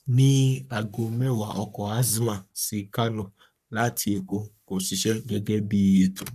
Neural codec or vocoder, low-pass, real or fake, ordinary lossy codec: codec, 44.1 kHz, 3.4 kbps, Pupu-Codec; 14.4 kHz; fake; none